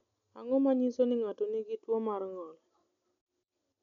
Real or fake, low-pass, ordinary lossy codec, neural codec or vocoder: real; 7.2 kHz; none; none